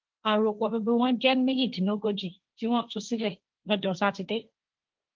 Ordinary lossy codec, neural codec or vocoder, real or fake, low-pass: Opus, 24 kbps; codec, 16 kHz, 1.1 kbps, Voila-Tokenizer; fake; 7.2 kHz